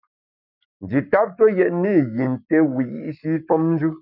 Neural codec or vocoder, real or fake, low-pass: codec, 44.1 kHz, 7.8 kbps, Pupu-Codec; fake; 5.4 kHz